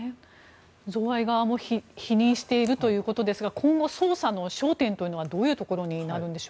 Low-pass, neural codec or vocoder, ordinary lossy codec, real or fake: none; none; none; real